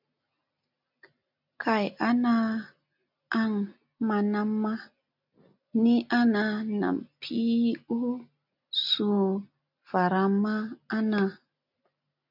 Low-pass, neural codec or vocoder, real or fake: 5.4 kHz; none; real